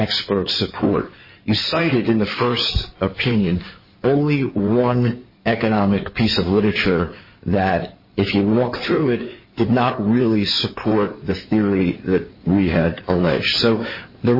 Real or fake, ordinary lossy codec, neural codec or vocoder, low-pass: fake; MP3, 24 kbps; codec, 16 kHz in and 24 kHz out, 2.2 kbps, FireRedTTS-2 codec; 5.4 kHz